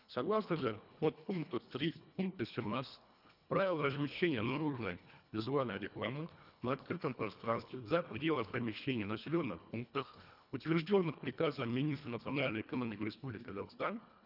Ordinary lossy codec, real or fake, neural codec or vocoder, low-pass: none; fake; codec, 24 kHz, 1.5 kbps, HILCodec; 5.4 kHz